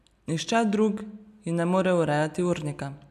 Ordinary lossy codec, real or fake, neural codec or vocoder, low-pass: none; real; none; 14.4 kHz